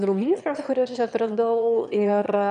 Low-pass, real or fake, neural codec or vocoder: 9.9 kHz; fake; autoencoder, 22.05 kHz, a latent of 192 numbers a frame, VITS, trained on one speaker